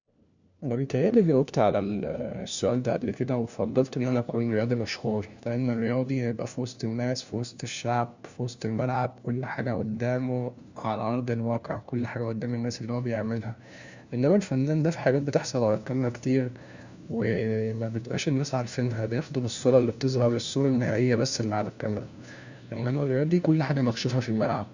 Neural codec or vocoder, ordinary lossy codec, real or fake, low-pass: codec, 16 kHz, 1 kbps, FunCodec, trained on LibriTTS, 50 frames a second; Opus, 64 kbps; fake; 7.2 kHz